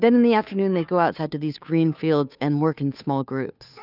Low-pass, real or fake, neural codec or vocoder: 5.4 kHz; fake; codec, 16 kHz, 2 kbps, FunCodec, trained on Chinese and English, 25 frames a second